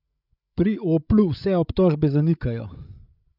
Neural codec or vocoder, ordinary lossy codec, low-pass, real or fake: codec, 16 kHz, 16 kbps, FreqCodec, larger model; none; 5.4 kHz; fake